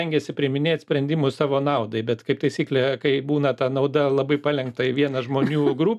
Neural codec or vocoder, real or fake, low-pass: none; real; 14.4 kHz